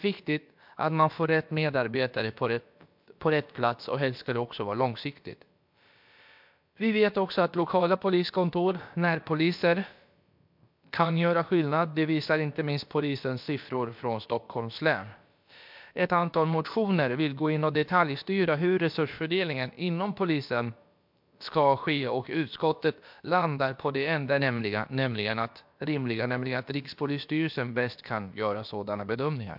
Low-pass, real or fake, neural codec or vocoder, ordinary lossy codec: 5.4 kHz; fake; codec, 16 kHz, about 1 kbps, DyCAST, with the encoder's durations; MP3, 48 kbps